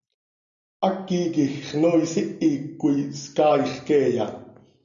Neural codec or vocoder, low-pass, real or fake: none; 7.2 kHz; real